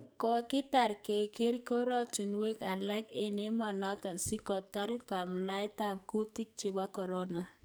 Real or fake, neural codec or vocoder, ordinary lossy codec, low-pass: fake; codec, 44.1 kHz, 2.6 kbps, SNAC; none; none